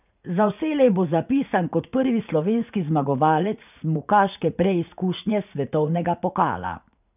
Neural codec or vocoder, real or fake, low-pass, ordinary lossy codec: vocoder, 44.1 kHz, 80 mel bands, Vocos; fake; 3.6 kHz; none